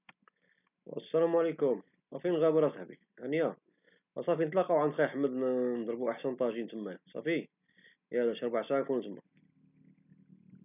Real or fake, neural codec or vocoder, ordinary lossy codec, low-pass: real; none; none; 3.6 kHz